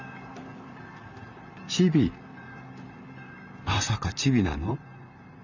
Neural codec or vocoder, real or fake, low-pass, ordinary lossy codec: vocoder, 44.1 kHz, 128 mel bands, Pupu-Vocoder; fake; 7.2 kHz; none